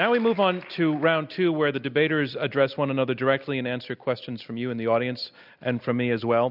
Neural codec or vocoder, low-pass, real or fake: none; 5.4 kHz; real